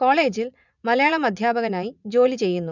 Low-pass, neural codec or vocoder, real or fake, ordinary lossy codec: 7.2 kHz; vocoder, 44.1 kHz, 80 mel bands, Vocos; fake; none